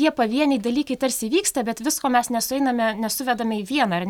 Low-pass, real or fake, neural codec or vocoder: 19.8 kHz; real; none